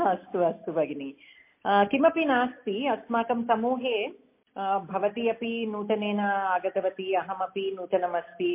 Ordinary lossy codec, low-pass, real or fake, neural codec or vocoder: MP3, 24 kbps; 3.6 kHz; real; none